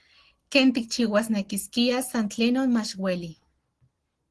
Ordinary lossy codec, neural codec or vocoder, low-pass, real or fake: Opus, 16 kbps; none; 10.8 kHz; real